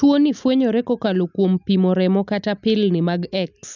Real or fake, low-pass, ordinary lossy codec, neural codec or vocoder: real; 7.2 kHz; none; none